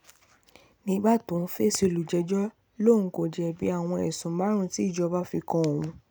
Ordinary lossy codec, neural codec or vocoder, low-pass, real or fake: none; none; none; real